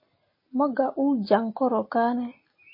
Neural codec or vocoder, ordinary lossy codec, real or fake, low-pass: none; MP3, 24 kbps; real; 5.4 kHz